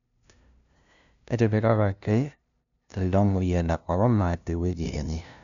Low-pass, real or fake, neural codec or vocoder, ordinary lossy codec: 7.2 kHz; fake; codec, 16 kHz, 0.5 kbps, FunCodec, trained on LibriTTS, 25 frames a second; none